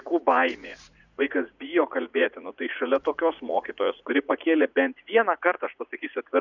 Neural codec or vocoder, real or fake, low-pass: vocoder, 44.1 kHz, 80 mel bands, Vocos; fake; 7.2 kHz